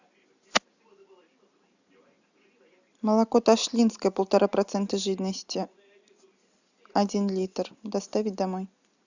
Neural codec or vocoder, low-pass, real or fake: none; 7.2 kHz; real